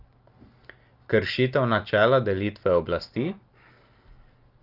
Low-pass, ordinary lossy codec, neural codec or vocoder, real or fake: 5.4 kHz; Opus, 32 kbps; none; real